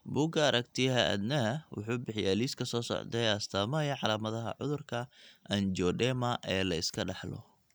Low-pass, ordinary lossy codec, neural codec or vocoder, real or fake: none; none; none; real